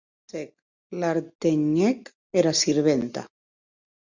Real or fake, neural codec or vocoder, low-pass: real; none; 7.2 kHz